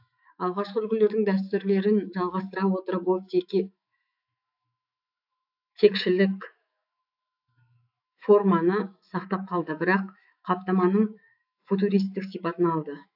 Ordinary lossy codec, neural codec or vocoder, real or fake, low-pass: AAC, 48 kbps; autoencoder, 48 kHz, 128 numbers a frame, DAC-VAE, trained on Japanese speech; fake; 5.4 kHz